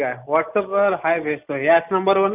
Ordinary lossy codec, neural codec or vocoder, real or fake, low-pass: none; none; real; 3.6 kHz